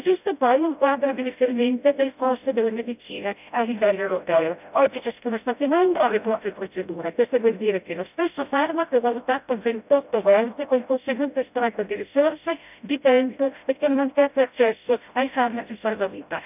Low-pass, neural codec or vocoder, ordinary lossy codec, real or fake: 3.6 kHz; codec, 16 kHz, 0.5 kbps, FreqCodec, smaller model; none; fake